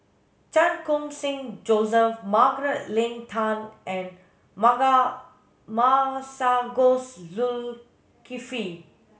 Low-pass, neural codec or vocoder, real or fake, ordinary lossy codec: none; none; real; none